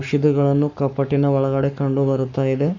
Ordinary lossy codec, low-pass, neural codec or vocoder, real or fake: none; 7.2 kHz; codec, 44.1 kHz, 7.8 kbps, Pupu-Codec; fake